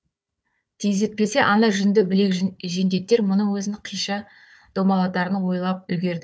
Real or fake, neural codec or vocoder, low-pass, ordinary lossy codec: fake; codec, 16 kHz, 4 kbps, FunCodec, trained on Chinese and English, 50 frames a second; none; none